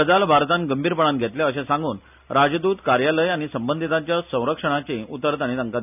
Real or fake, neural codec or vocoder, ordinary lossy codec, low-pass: real; none; none; 3.6 kHz